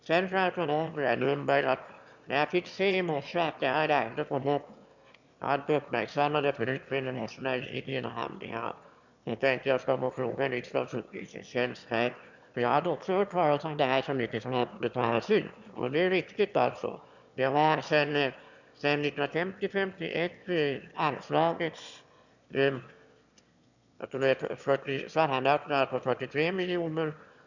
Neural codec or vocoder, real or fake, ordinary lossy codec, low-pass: autoencoder, 22.05 kHz, a latent of 192 numbers a frame, VITS, trained on one speaker; fake; none; 7.2 kHz